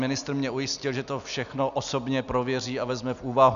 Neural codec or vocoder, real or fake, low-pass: none; real; 7.2 kHz